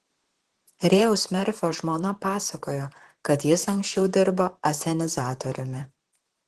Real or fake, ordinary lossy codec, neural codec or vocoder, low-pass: fake; Opus, 16 kbps; vocoder, 44.1 kHz, 128 mel bands, Pupu-Vocoder; 14.4 kHz